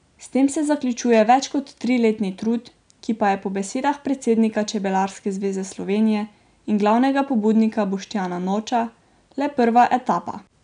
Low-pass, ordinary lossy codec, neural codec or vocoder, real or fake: 9.9 kHz; none; none; real